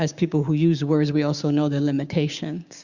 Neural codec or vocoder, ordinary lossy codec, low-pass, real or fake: codec, 16 kHz, 6 kbps, DAC; Opus, 64 kbps; 7.2 kHz; fake